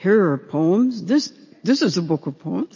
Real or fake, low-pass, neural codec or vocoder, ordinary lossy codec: real; 7.2 kHz; none; MP3, 32 kbps